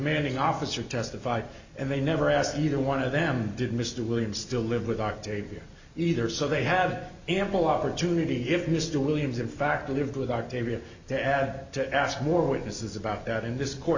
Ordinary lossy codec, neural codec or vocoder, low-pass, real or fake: Opus, 64 kbps; none; 7.2 kHz; real